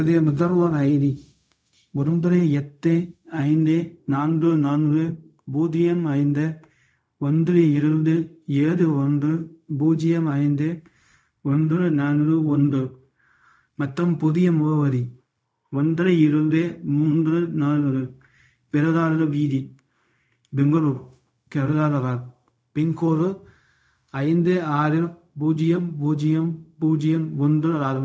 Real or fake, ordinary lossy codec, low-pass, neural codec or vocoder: fake; none; none; codec, 16 kHz, 0.4 kbps, LongCat-Audio-Codec